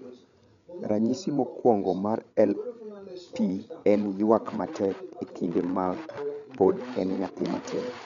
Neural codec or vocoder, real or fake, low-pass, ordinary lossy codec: codec, 16 kHz, 8 kbps, FreqCodec, larger model; fake; 7.2 kHz; MP3, 96 kbps